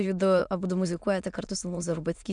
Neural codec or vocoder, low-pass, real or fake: autoencoder, 22.05 kHz, a latent of 192 numbers a frame, VITS, trained on many speakers; 9.9 kHz; fake